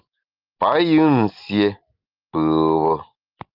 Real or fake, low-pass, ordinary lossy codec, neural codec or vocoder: real; 5.4 kHz; Opus, 24 kbps; none